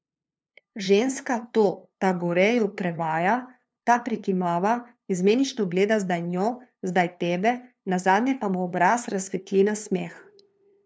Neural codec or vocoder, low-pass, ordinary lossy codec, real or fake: codec, 16 kHz, 2 kbps, FunCodec, trained on LibriTTS, 25 frames a second; none; none; fake